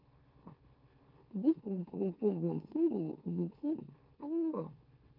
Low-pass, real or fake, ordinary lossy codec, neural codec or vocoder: 5.4 kHz; fake; Opus, 32 kbps; autoencoder, 44.1 kHz, a latent of 192 numbers a frame, MeloTTS